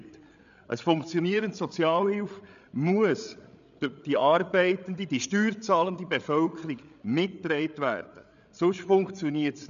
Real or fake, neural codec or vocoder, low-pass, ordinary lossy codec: fake; codec, 16 kHz, 8 kbps, FreqCodec, larger model; 7.2 kHz; none